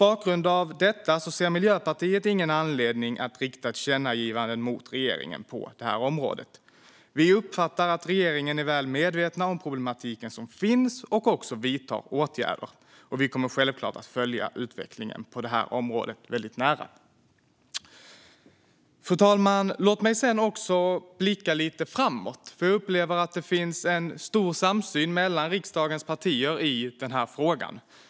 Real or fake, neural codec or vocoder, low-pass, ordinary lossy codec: real; none; none; none